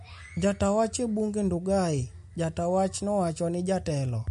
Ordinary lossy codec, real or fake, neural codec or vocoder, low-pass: MP3, 48 kbps; real; none; 14.4 kHz